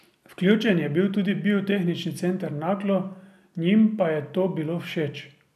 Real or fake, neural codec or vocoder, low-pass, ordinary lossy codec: real; none; 14.4 kHz; none